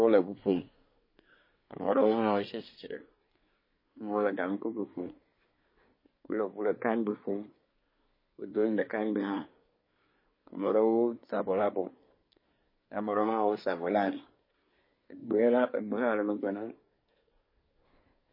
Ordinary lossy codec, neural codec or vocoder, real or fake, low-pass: MP3, 24 kbps; codec, 24 kHz, 1 kbps, SNAC; fake; 5.4 kHz